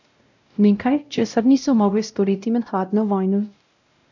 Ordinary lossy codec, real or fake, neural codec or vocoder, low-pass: none; fake; codec, 16 kHz, 0.5 kbps, X-Codec, WavLM features, trained on Multilingual LibriSpeech; 7.2 kHz